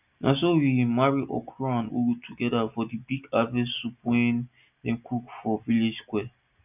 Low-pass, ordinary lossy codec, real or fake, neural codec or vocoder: 3.6 kHz; none; real; none